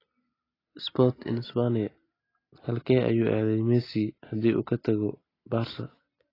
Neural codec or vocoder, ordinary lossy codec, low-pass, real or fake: none; AAC, 24 kbps; 5.4 kHz; real